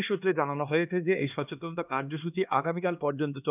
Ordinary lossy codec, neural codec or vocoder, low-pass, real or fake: none; codec, 16 kHz, 2 kbps, X-Codec, HuBERT features, trained on LibriSpeech; 3.6 kHz; fake